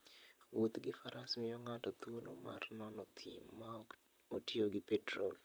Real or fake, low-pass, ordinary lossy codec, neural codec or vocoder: fake; none; none; vocoder, 44.1 kHz, 128 mel bands, Pupu-Vocoder